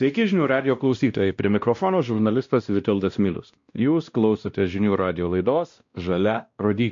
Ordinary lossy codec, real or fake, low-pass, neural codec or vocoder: MP3, 48 kbps; fake; 7.2 kHz; codec, 16 kHz, 1 kbps, X-Codec, WavLM features, trained on Multilingual LibriSpeech